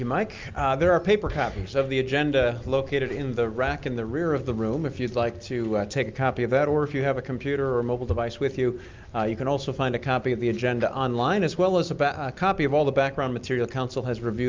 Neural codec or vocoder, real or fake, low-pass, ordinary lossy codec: none; real; 7.2 kHz; Opus, 24 kbps